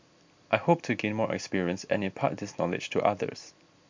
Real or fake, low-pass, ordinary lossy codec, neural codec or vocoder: real; 7.2 kHz; MP3, 64 kbps; none